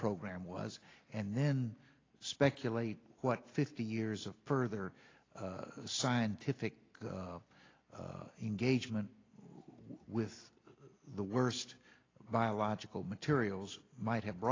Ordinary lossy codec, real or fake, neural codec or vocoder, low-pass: AAC, 32 kbps; real; none; 7.2 kHz